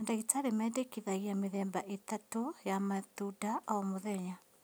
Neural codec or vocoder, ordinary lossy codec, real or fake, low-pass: none; none; real; none